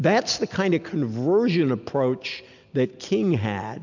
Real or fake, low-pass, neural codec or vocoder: real; 7.2 kHz; none